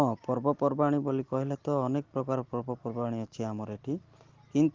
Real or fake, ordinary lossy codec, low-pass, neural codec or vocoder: real; Opus, 16 kbps; 7.2 kHz; none